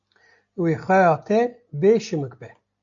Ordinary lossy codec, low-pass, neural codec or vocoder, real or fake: AAC, 64 kbps; 7.2 kHz; none; real